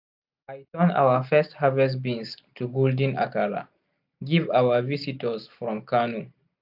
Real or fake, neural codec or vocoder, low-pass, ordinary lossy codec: real; none; 5.4 kHz; AAC, 48 kbps